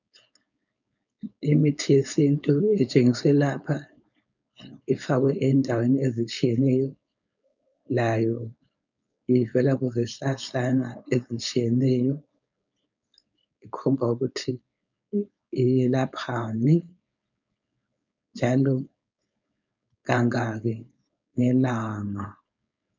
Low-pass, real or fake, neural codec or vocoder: 7.2 kHz; fake; codec, 16 kHz, 4.8 kbps, FACodec